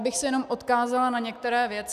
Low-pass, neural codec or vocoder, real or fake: 14.4 kHz; none; real